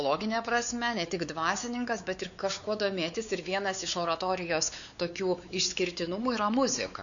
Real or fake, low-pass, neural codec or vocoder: fake; 7.2 kHz; codec, 16 kHz, 4 kbps, X-Codec, WavLM features, trained on Multilingual LibriSpeech